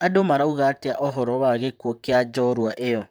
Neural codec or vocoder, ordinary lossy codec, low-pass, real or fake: vocoder, 44.1 kHz, 128 mel bands, Pupu-Vocoder; none; none; fake